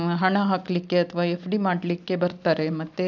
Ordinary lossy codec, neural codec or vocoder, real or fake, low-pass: none; none; real; 7.2 kHz